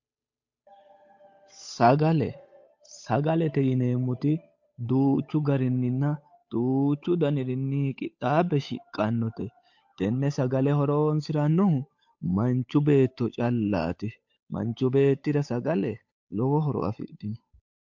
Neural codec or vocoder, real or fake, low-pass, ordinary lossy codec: codec, 16 kHz, 8 kbps, FunCodec, trained on Chinese and English, 25 frames a second; fake; 7.2 kHz; MP3, 48 kbps